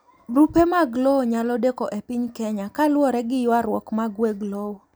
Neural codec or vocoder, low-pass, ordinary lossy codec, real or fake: none; none; none; real